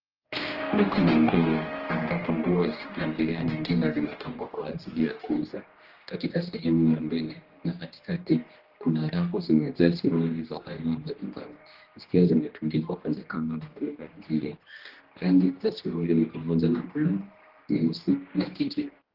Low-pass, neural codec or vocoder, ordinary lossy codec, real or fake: 5.4 kHz; codec, 16 kHz, 1 kbps, X-Codec, HuBERT features, trained on general audio; Opus, 16 kbps; fake